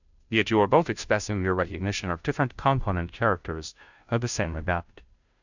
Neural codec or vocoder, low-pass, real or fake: codec, 16 kHz, 0.5 kbps, FunCodec, trained on Chinese and English, 25 frames a second; 7.2 kHz; fake